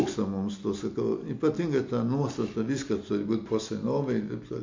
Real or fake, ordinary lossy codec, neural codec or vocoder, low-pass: real; MP3, 48 kbps; none; 7.2 kHz